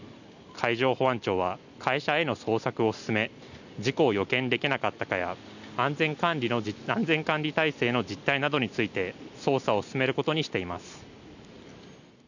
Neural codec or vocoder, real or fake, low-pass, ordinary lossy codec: none; real; 7.2 kHz; none